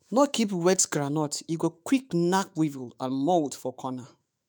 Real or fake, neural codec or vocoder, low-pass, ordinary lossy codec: fake; autoencoder, 48 kHz, 128 numbers a frame, DAC-VAE, trained on Japanese speech; none; none